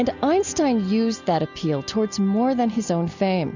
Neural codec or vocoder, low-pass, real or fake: none; 7.2 kHz; real